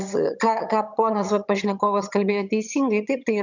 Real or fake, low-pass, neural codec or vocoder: fake; 7.2 kHz; vocoder, 22.05 kHz, 80 mel bands, HiFi-GAN